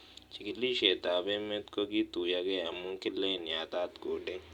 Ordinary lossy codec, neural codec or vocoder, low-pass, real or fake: Opus, 64 kbps; none; 19.8 kHz; real